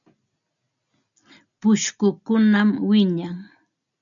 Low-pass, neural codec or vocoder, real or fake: 7.2 kHz; none; real